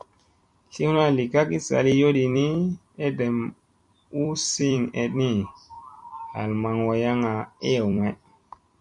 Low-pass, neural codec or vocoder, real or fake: 10.8 kHz; vocoder, 44.1 kHz, 128 mel bands every 256 samples, BigVGAN v2; fake